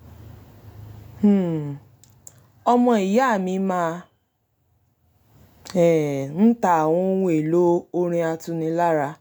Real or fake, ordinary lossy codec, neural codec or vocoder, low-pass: real; none; none; none